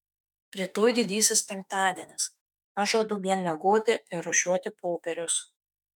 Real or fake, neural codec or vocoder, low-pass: fake; autoencoder, 48 kHz, 32 numbers a frame, DAC-VAE, trained on Japanese speech; 19.8 kHz